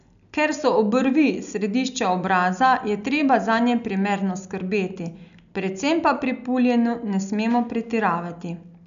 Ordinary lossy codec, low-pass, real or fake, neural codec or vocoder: none; 7.2 kHz; real; none